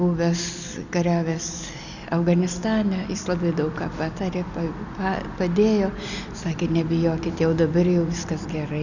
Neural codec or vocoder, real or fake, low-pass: none; real; 7.2 kHz